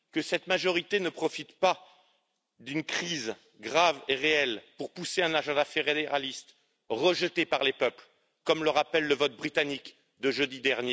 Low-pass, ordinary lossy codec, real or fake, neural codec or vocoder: none; none; real; none